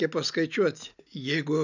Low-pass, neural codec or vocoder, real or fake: 7.2 kHz; none; real